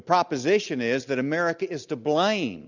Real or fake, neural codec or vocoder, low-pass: real; none; 7.2 kHz